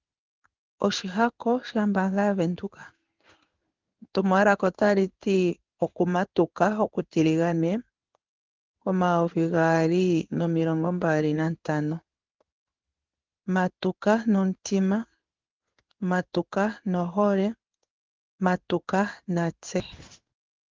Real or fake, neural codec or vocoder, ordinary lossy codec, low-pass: fake; codec, 16 kHz in and 24 kHz out, 1 kbps, XY-Tokenizer; Opus, 32 kbps; 7.2 kHz